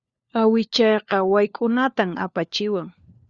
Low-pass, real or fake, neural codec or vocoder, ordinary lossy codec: 7.2 kHz; fake; codec, 16 kHz, 4 kbps, FunCodec, trained on LibriTTS, 50 frames a second; Opus, 64 kbps